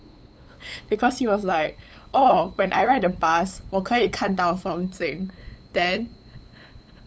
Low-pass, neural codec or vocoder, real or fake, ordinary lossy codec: none; codec, 16 kHz, 8 kbps, FunCodec, trained on LibriTTS, 25 frames a second; fake; none